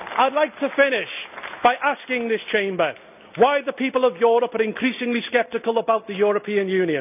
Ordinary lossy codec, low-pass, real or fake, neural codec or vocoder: none; 3.6 kHz; real; none